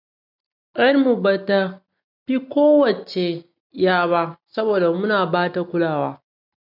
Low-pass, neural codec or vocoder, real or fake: 5.4 kHz; none; real